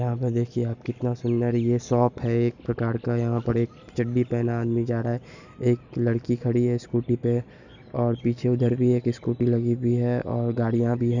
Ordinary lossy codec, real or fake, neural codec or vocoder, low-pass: none; real; none; 7.2 kHz